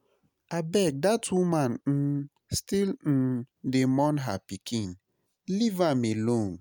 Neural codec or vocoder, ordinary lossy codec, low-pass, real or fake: none; none; none; real